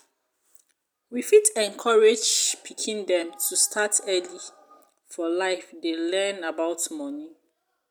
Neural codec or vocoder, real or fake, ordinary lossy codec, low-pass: none; real; none; none